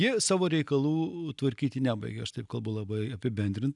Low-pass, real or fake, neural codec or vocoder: 10.8 kHz; real; none